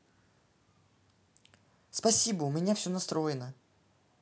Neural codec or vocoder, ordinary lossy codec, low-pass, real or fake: none; none; none; real